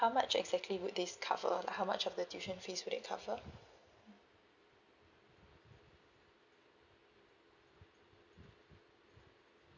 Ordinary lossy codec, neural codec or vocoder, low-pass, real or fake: none; none; 7.2 kHz; real